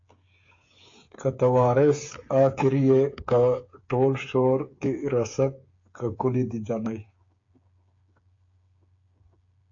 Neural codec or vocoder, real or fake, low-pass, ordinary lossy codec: codec, 16 kHz, 8 kbps, FreqCodec, smaller model; fake; 7.2 kHz; AAC, 48 kbps